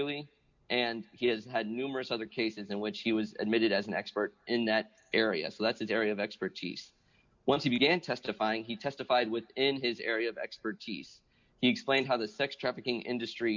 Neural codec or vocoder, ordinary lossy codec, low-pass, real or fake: none; MP3, 64 kbps; 7.2 kHz; real